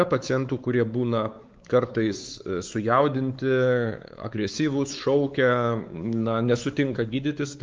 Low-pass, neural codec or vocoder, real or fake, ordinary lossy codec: 7.2 kHz; codec, 16 kHz, 4 kbps, X-Codec, WavLM features, trained on Multilingual LibriSpeech; fake; Opus, 32 kbps